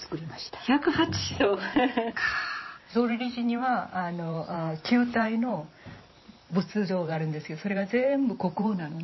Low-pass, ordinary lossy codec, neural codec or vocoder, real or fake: 7.2 kHz; MP3, 24 kbps; vocoder, 44.1 kHz, 128 mel bands every 512 samples, BigVGAN v2; fake